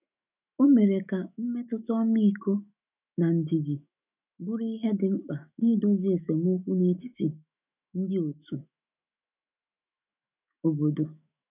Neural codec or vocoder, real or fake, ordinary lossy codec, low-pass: autoencoder, 48 kHz, 128 numbers a frame, DAC-VAE, trained on Japanese speech; fake; none; 3.6 kHz